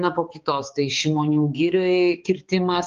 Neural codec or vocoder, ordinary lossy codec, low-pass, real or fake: codec, 16 kHz, 4 kbps, X-Codec, HuBERT features, trained on balanced general audio; Opus, 32 kbps; 7.2 kHz; fake